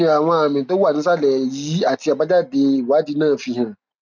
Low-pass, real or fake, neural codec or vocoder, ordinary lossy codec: none; real; none; none